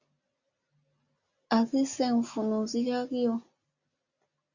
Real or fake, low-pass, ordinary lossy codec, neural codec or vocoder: real; 7.2 kHz; Opus, 64 kbps; none